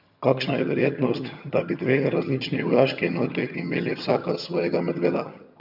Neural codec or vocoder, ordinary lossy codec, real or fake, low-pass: vocoder, 22.05 kHz, 80 mel bands, HiFi-GAN; MP3, 48 kbps; fake; 5.4 kHz